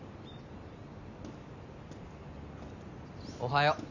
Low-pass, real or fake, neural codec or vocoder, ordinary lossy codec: 7.2 kHz; real; none; none